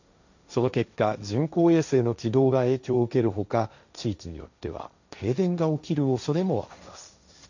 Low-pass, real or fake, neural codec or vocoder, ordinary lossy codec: 7.2 kHz; fake; codec, 16 kHz, 1.1 kbps, Voila-Tokenizer; none